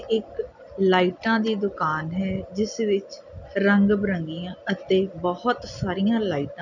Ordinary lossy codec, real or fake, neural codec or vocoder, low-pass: none; real; none; 7.2 kHz